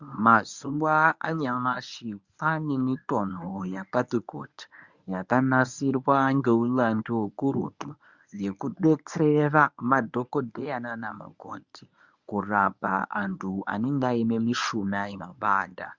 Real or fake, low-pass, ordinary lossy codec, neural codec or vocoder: fake; 7.2 kHz; Opus, 64 kbps; codec, 24 kHz, 0.9 kbps, WavTokenizer, medium speech release version 1